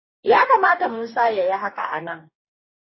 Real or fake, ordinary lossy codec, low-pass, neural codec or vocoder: fake; MP3, 24 kbps; 7.2 kHz; codec, 44.1 kHz, 2.6 kbps, DAC